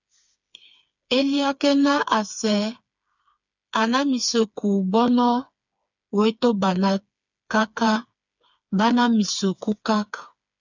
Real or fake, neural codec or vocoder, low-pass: fake; codec, 16 kHz, 4 kbps, FreqCodec, smaller model; 7.2 kHz